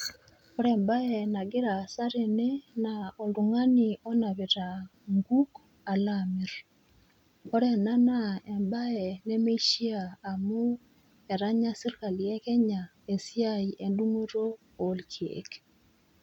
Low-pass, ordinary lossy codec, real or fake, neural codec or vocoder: 19.8 kHz; none; real; none